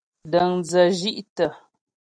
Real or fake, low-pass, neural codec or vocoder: real; 9.9 kHz; none